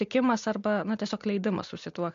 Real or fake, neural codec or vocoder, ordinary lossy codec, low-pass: real; none; MP3, 48 kbps; 7.2 kHz